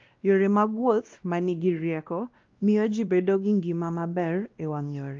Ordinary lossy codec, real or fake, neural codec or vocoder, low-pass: Opus, 32 kbps; fake; codec, 16 kHz, 1 kbps, X-Codec, WavLM features, trained on Multilingual LibriSpeech; 7.2 kHz